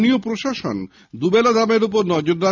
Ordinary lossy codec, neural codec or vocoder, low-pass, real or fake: none; none; 7.2 kHz; real